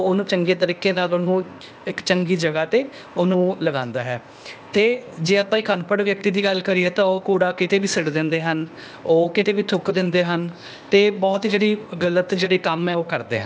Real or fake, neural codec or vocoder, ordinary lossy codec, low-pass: fake; codec, 16 kHz, 0.8 kbps, ZipCodec; none; none